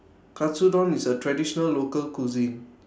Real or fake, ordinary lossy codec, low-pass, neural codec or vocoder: real; none; none; none